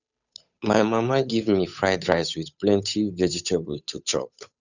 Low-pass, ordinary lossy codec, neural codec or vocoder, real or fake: 7.2 kHz; none; codec, 16 kHz, 8 kbps, FunCodec, trained on Chinese and English, 25 frames a second; fake